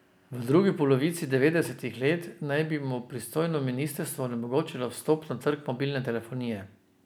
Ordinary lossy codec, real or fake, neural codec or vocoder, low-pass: none; real; none; none